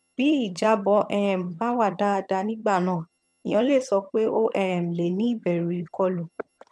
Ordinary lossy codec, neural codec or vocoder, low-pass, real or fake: none; vocoder, 22.05 kHz, 80 mel bands, HiFi-GAN; none; fake